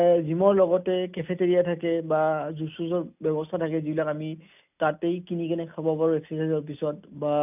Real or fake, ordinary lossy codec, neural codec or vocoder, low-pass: real; none; none; 3.6 kHz